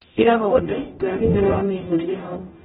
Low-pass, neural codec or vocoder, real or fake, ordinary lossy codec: 19.8 kHz; codec, 44.1 kHz, 0.9 kbps, DAC; fake; AAC, 16 kbps